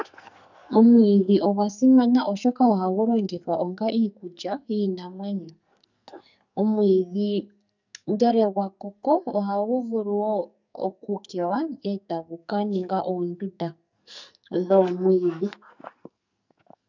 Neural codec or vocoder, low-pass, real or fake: codec, 44.1 kHz, 2.6 kbps, SNAC; 7.2 kHz; fake